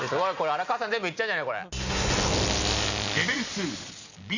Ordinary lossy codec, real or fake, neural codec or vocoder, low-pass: none; real; none; 7.2 kHz